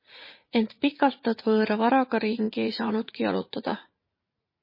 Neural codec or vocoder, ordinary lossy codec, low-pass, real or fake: vocoder, 44.1 kHz, 80 mel bands, Vocos; MP3, 24 kbps; 5.4 kHz; fake